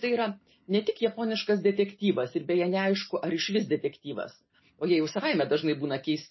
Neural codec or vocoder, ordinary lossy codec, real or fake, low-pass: none; MP3, 24 kbps; real; 7.2 kHz